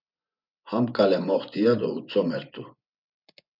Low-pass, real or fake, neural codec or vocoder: 5.4 kHz; fake; vocoder, 24 kHz, 100 mel bands, Vocos